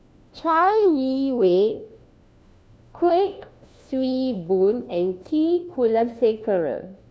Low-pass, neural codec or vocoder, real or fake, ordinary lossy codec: none; codec, 16 kHz, 1 kbps, FunCodec, trained on LibriTTS, 50 frames a second; fake; none